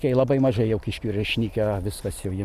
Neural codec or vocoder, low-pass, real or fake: none; 14.4 kHz; real